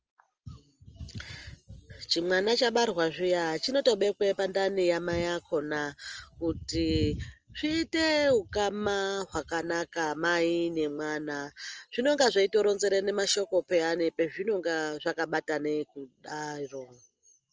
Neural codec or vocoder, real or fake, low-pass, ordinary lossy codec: none; real; 7.2 kHz; Opus, 24 kbps